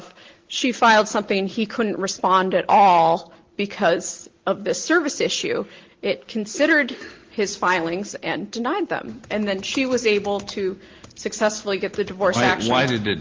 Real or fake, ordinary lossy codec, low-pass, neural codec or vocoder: real; Opus, 16 kbps; 7.2 kHz; none